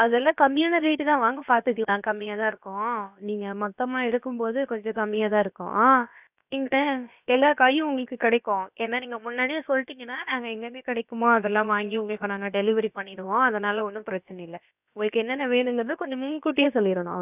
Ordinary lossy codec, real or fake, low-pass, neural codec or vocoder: none; fake; 3.6 kHz; codec, 16 kHz, about 1 kbps, DyCAST, with the encoder's durations